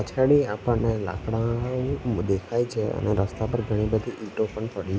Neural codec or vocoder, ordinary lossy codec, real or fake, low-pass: none; none; real; none